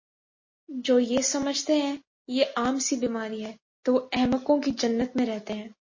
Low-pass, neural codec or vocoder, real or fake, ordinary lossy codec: 7.2 kHz; none; real; MP3, 32 kbps